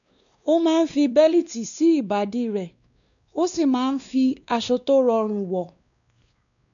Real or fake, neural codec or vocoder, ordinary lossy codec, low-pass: fake; codec, 16 kHz, 2 kbps, X-Codec, WavLM features, trained on Multilingual LibriSpeech; none; 7.2 kHz